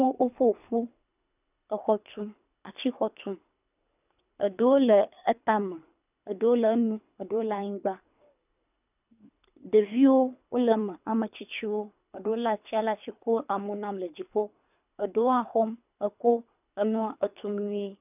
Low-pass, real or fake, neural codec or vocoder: 3.6 kHz; fake; codec, 24 kHz, 3 kbps, HILCodec